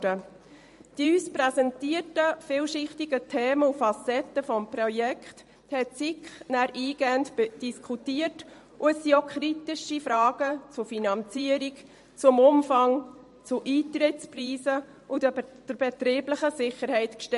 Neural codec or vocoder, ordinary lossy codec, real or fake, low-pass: vocoder, 44.1 kHz, 128 mel bands every 512 samples, BigVGAN v2; MP3, 48 kbps; fake; 14.4 kHz